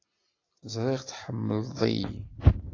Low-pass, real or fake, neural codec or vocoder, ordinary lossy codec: 7.2 kHz; real; none; AAC, 32 kbps